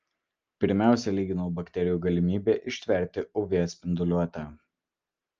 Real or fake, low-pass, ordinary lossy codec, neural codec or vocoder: real; 7.2 kHz; Opus, 24 kbps; none